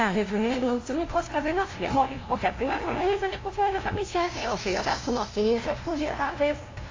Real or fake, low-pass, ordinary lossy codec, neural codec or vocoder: fake; 7.2 kHz; AAC, 32 kbps; codec, 16 kHz, 0.5 kbps, FunCodec, trained on LibriTTS, 25 frames a second